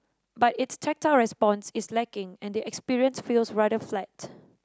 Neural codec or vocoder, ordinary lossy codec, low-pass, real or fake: none; none; none; real